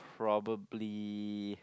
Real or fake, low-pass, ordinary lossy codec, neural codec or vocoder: real; none; none; none